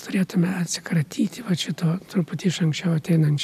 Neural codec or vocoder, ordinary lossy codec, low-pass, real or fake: none; AAC, 96 kbps; 14.4 kHz; real